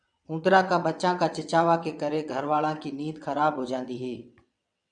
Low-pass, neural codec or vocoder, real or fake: 9.9 kHz; vocoder, 22.05 kHz, 80 mel bands, WaveNeXt; fake